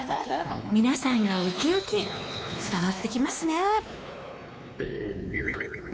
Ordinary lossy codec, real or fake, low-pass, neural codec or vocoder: none; fake; none; codec, 16 kHz, 2 kbps, X-Codec, WavLM features, trained on Multilingual LibriSpeech